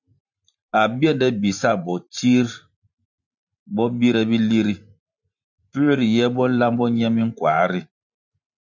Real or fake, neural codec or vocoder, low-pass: fake; vocoder, 44.1 kHz, 128 mel bands every 512 samples, BigVGAN v2; 7.2 kHz